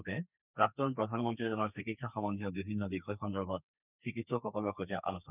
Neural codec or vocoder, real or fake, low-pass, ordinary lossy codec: codec, 32 kHz, 1.9 kbps, SNAC; fake; 3.6 kHz; none